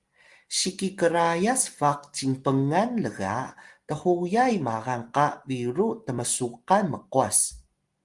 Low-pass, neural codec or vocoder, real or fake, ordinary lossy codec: 10.8 kHz; none; real; Opus, 24 kbps